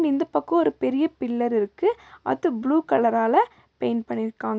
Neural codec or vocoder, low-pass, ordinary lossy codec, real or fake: none; none; none; real